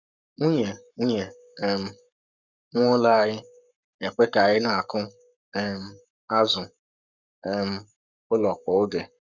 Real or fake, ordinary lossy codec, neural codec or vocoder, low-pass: fake; none; codec, 44.1 kHz, 7.8 kbps, Pupu-Codec; 7.2 kHz